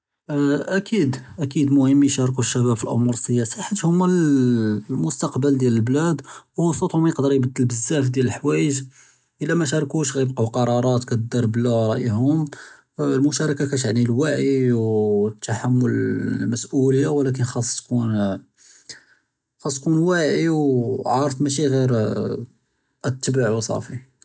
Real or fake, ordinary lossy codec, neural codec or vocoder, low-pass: real; none; none; none